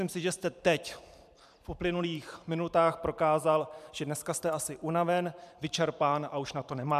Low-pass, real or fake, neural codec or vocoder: 14.4 kHz; fake; vocoder, 44.1 kHz, 128 mel bands every 512 samples, BigVGAN v2